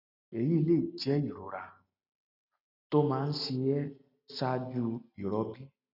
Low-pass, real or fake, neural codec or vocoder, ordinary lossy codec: 5.4 kHz; real; none; none